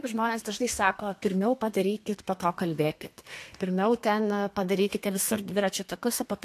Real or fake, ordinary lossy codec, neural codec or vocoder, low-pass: fake; AAC, 64 kbps; codec, 32 kHz, 1.9 kbps, SNAC; 14.4 kHz